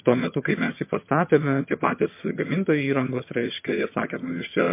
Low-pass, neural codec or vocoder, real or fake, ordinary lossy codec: 3.6 kHz; vocoder, 22.05 kHz, 80 mel bands, HiFi-GAN; fake; MP3, 24 kbps